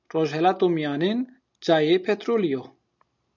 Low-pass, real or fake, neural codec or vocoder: 7.2 kHz; real; none